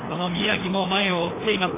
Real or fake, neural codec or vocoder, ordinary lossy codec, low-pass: fake; codec, 16 kHz, 2 kbps, FreqCodec, larger model; AAC, 16 kbps; 3.6 kHz